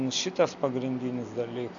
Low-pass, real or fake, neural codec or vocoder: 7.2 kHz; real; none